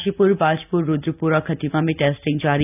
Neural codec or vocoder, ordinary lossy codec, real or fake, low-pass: none; none; real; 3.6 kHz